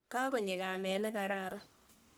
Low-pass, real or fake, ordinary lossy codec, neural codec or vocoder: none; fake; none; codec, 44.1 kHz, 1.7 kbps, Pupu-Codec